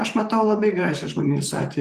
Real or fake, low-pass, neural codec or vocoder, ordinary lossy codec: fake; 14.4 kHz; vocoder, 44.1 kHz, 128 mel bands, Pupu-Vocoder; Opus, 24 kbps